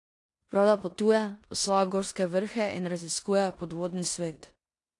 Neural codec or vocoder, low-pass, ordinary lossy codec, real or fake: codec, 16 kHz in and 24 kHz out, 0.9 kbps, LongCat-Audio-Codec, four codebook decoder; 10.8 kHz; AAC, 48 kbps; fake